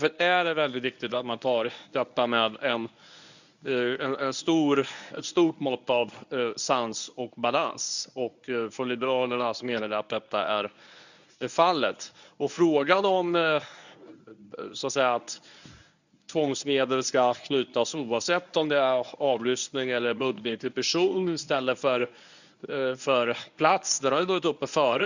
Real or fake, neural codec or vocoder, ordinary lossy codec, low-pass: fake; codec, 24 kHz, 0.9 kbps, WavTokenizer, medium speech release version 1; none; 7.2 kHz